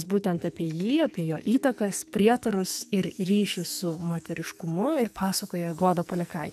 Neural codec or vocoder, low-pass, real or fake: codec, 44.1 kHz, 2.6 kbps, SNAC; 14.4 kHz; fake